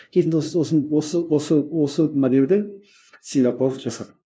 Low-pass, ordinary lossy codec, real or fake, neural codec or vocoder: none; none; fake; codec, 16 kHz, 0.5 kbps, FunCodec, trained on LibriTTS, 25 frames a second